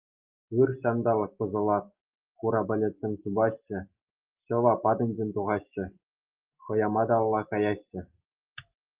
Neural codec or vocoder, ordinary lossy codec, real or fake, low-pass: none; Opus, 32 kbps; real; 3.6 kHz